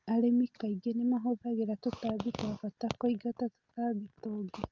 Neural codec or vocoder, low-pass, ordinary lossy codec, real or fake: none; 7.2 kHz; Opus, 32 kbps; real